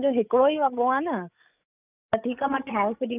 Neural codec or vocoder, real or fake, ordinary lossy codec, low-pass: codec, 16 kHz, 8 kbps, FreqCodec, larger model; fake; none; 3.6 kHz